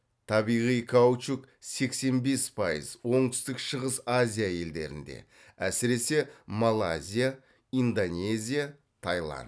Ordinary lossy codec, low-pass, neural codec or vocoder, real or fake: none; none; none; real